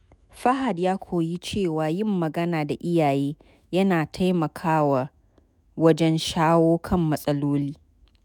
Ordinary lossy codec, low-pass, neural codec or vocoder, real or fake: none; 19.8 kHz; autoencoder, 48 kHz, 128 numbers a frame, DAC-VAE, trained on Japanese speech; fake